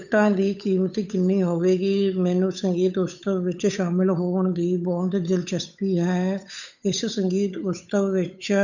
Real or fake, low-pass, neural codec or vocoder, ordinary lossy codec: fake; 7.2 kHz; codec, 16 kHz, 8 kbps, FunCodec, trained on Chinese and English, 25 frames a second; none